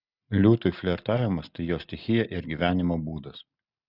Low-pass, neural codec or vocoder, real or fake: 5.4 kHz; none; real